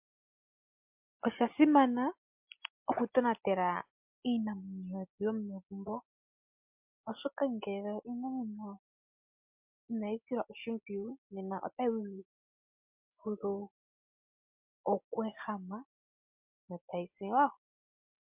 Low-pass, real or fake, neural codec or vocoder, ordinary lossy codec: 3.6 kHz; real; none; MP3, 32 kbps